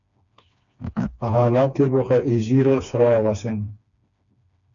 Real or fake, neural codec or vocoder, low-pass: fake; codec, 16 kHz, 2 kbps, FreqCodec, smaller model; 7.2 kHz